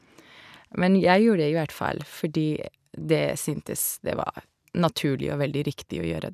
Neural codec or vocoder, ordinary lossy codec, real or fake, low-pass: none; none; real; 14.4 kHz